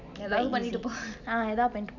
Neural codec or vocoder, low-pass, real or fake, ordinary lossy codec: none; 7.2 kHz; real; none